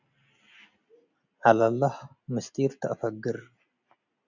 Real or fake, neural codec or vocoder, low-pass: real; none; 7.2 kHz